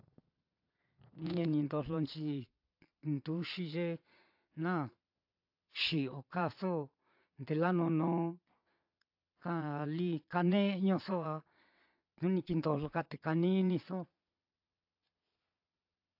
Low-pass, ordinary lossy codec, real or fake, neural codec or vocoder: 5.4 kHz; AAC, 48 kbps; fake; vocoder, 22.05 kHz, 80 mel bands, Vocos